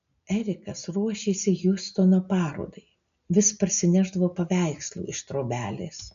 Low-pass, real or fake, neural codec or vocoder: 7.2 kHz; real; none